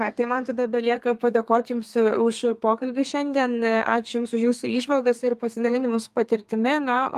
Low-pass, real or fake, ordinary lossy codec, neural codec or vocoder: 14.4 kHz; fake; Opus, 32 kbps; codec, 32 kHz, 1.9 kbps, SNAC